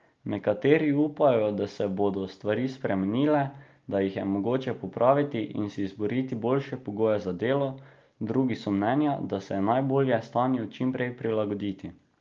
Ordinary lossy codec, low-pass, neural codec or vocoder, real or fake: Opus, 24 kbps; 7.2 kHz; none; real